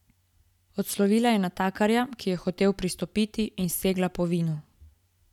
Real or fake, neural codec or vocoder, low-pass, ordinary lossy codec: real; none; 19.8 kHz; none